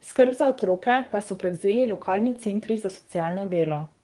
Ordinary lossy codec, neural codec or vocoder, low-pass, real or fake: Opus, 24 kbps; codec, 24 kHz, 1 kbps, SNAC; 10.8 kHz; fake